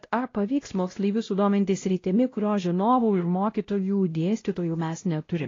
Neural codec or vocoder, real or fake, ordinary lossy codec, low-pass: codec, 16 kHz, 0.5 kbps, X-Codec, WavLM features, trained on Multilingual LibriSpeech; fake; AAC, 32 kbps; 7.2 kHz